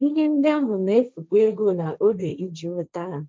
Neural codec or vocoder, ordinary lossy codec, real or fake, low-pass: codec, 16 kHz, 1.1 kbps, Voila-Tokenizer; none; fake; none